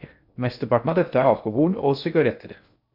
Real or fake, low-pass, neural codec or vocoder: fake; 5.4 kHz; codec, 16 kHz in and 24 kHz out, 0.6 kbps, FocalCodec, streaming, 2048 codes